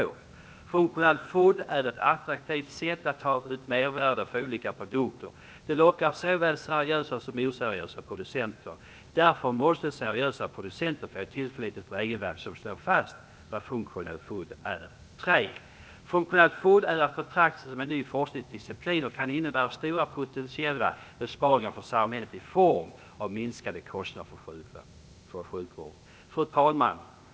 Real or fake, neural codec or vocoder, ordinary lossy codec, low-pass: fake; codec, 16 kHz, 0.8 kbps, ZipCodec; none; none